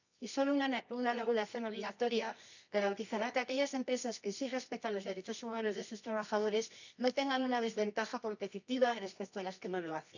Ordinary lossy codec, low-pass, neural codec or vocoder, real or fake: none; 7.2 kHz; codec, 24 kHz, 0.9 kbps, WavTokenizer, medium music audio release; fake